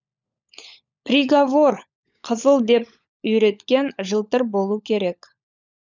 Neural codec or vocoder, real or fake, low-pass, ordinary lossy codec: codec, 16 kHz, 16 kbps, FunCodec, trained on LibriTTS, 50 frames a second; fake; 7.2 kHz; none